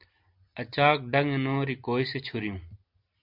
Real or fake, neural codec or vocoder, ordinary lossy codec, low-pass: real; none; MP3, 48 kbps; 5.4 kHz